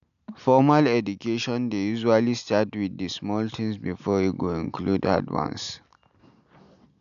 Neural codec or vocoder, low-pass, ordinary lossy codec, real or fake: none; 7.2 kHz; none; real